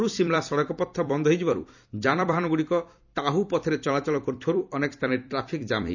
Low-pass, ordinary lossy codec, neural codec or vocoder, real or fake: 7.2 kHz; none; vocoder, 44.1 kHz, 128 mel bands every 256 samples, BigVGAN v2; fake